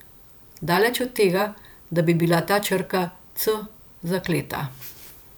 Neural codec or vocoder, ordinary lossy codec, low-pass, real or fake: vocoder, 44.1 kHz, 128 mel bands every 512 samples, BigVGAN v2; none; none; fake